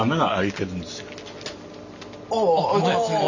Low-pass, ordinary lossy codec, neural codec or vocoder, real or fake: 7.2 kHz; none; none; real